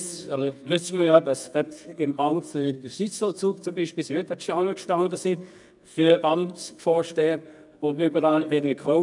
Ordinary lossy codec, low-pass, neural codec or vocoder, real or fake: none; 10.8 kHz; codec, 24 kHz, 0.9 kbps, WavTokenizer, medium music audio release; fake